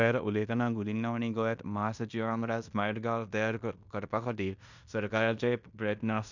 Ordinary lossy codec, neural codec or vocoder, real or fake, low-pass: none; codec, 16 kHz in and 24 kHz out, 0.9 kbps, LongCat-Audio-Codec, fine tuned four codebook decoder; fake; 7.2 kHz